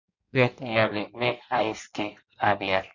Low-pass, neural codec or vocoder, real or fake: 7.2 kHz; codec, 16 kHz in and 24 kHz out, 1.1 kbps, FireRedTTS-2 codec; fake